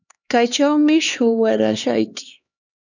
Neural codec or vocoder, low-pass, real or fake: codec, 16 kHz, 2 kbps, X-Codec, HuBERT features, trained on LibriSpeech; 7.2 kHz; fake